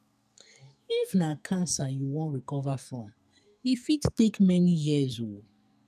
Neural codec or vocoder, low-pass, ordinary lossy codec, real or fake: codec, 44.1 kHz, 2.6 kbps, SNAC; 14.4 kHz; none; fake